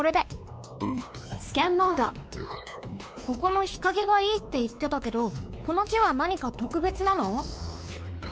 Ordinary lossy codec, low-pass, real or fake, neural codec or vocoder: none; none; fake; codec, 16 kHz, 2 kbps, X-Codec, WavLM features, trained on Multilingual LibriSpeech